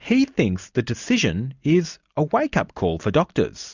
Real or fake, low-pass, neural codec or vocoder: real; 7.2 kHz; none